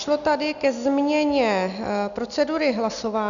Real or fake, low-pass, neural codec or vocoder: real; 7.2 kHz; none